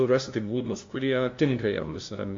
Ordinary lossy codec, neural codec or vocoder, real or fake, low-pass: AAC, 48 kbps; codec, 16 kHz, 0.5 kbps, FunCodec, trained on LibriTTS, 25 frames a second; fake; 7.2 kHz